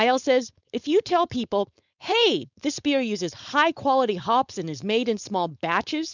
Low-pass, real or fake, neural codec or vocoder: 7.2 kHz; fake; codec, 16 kHz, 4.8 kbps, FACodec